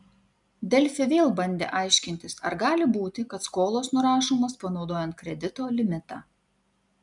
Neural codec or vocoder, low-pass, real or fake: none; 10.8 kHz; real